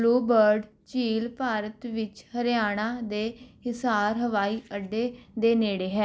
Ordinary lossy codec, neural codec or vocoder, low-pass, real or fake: none; none; none; real